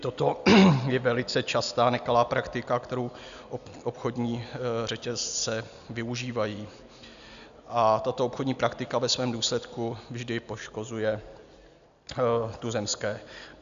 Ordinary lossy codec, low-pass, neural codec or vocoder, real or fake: AAC, 96 kbps; 7.2 kHz; none; real